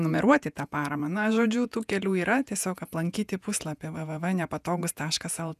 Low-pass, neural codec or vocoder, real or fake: 14.4 kHz; vocoder, 48 kHz, 128 mel bands, Vocos; fake